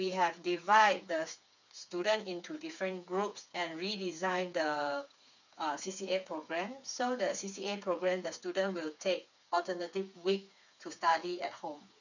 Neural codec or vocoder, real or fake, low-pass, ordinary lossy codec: codec, 16 kHz, 4 kbps, FreqCodec, smaller model; fake; 7.2 kHz; none